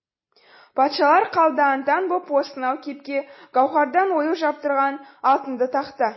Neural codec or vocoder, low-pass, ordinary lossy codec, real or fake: none; 7.2 kHz; MP3, 24 kbps; real